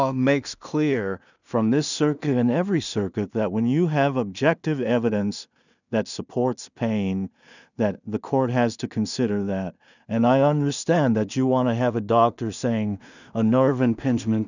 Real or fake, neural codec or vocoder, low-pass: fake; codec, 16 kHz in and 24 kHz out, 0.4 kbps, LongCat-Audio-Codec, two codebook decoder; 7.2 kHz